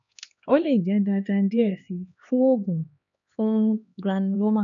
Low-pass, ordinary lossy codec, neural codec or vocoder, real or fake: 7.2 kHz; none; codec, 16 kHz, 2 kbps, X-Codec, HuBERT features, trained on LibriSpeech; fake